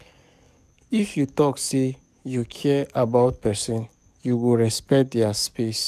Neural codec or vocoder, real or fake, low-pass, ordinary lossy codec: codec, 44.1 kHz, 7.8 kbps, DAC; fake; 14.4 kHz; none